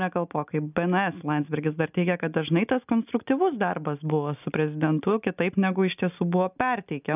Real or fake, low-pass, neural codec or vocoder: real; 3.6 kHz; none